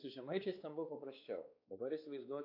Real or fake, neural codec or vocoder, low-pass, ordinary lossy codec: fake; codec, 16 kHz, 4 kbps, X-Codec, WavLM features, trained on Multilingual LibriSpeech; 5.4 kHz; AAC, 48 kbps